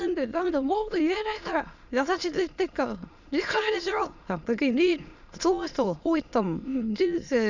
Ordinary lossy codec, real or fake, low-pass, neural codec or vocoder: none; fake; 7.2 kHz; autoencoder, 22.05 kHz, a latent of 192 numbers a frame, VITS, trained on many speakers